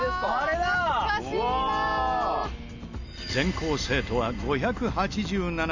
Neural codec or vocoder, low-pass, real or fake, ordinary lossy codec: none; 7.2 kHz; real; Opus, 64 kbps